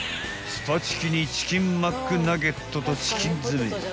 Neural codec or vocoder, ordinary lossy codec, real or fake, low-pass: none; none; real; none